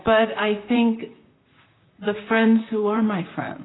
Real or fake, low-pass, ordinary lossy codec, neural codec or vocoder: fake; 7.2 kHz; AAC, 16 kbps; vocoder, 44.1 kHz, 128 mel bands, Pupu-Vocoder